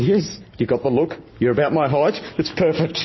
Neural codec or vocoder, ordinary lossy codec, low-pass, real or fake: none; MP3, 24 kbps; 7.2 kHz; real